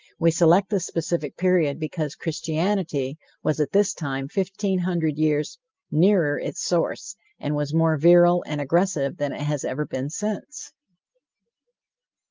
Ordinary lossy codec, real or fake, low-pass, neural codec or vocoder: Opus, 32 kbps; real; 7.2 kHz; none